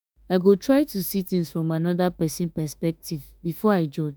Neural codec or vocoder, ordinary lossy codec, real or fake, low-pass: autoencoder, 48 kHz, 32 numbers a frame, DAC-VAE, trained on Japanese speech; none; fake; none